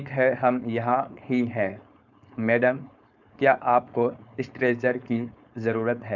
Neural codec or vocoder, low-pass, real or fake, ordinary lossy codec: codec, 16 kHz, 4.8 kbps, FACodec; 7.2 kHz; fake; none